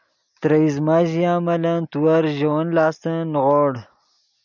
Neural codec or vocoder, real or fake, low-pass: none; real; 7.2 kHz